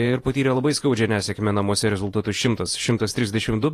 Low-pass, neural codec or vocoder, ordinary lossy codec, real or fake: 14.4 kHz; vocoder, 48 kHz, 128 mel bands, Vocos; AAC, 48 kbps; fake